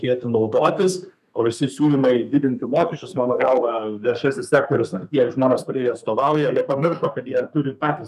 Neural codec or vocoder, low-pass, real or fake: codec, 32 kHz, 1.9 kbps, SNAC; 14.4 kHz; fake